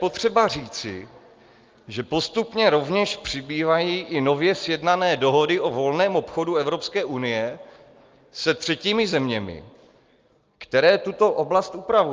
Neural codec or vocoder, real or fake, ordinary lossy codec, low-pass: none; real; Opus, 24 kbps; 7.2 kHz